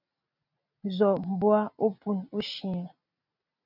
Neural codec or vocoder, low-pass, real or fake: none; 5.4 kHz; real